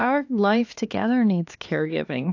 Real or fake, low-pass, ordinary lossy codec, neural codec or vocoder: fake; 7.2 kHz; AAC, 48 kbps; codec, 16 kHz, 2 kbps, X-Codec, HuBERT features, trained on LibriSpeech